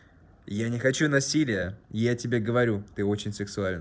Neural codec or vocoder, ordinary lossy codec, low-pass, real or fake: none; none; none; real